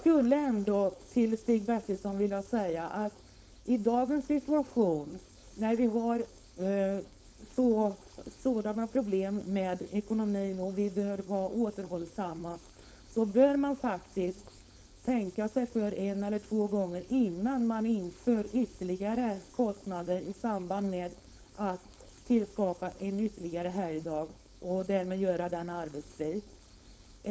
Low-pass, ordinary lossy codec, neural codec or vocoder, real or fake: none; none; codec, 16 kHz, 4.8 kbps, FACodec; fake